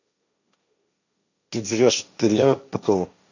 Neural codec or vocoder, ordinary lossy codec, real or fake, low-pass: codec, 16 kHz, 1.1 kbps, Voila-Tokenizer; none; fake; 7.2 kHz